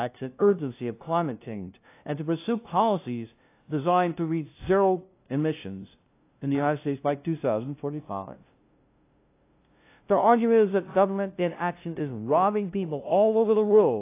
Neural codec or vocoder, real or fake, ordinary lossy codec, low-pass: codec, 16 kHz, 0.5 kbps, FunCodec, trained on LibriTTS, 25 frames a second; fake; AAC, 24 kbps; 3.6 kHz